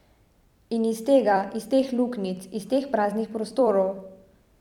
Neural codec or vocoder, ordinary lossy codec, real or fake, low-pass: vocoder, 44.1 kHz, 128 mel bands every 256 samples, BigVGAN v2; none; fake; 19.8 kHz